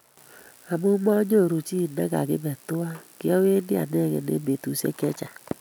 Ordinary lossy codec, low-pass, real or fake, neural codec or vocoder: none; none; real; none